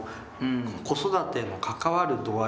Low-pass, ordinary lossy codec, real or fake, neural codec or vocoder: none; none; real; none